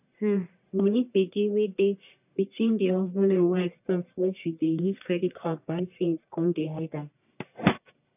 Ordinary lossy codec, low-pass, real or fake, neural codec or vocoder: none; 3.6 kHz; fake; codec, 44.1 kHz, 1.7 kbps, Pupu-Codec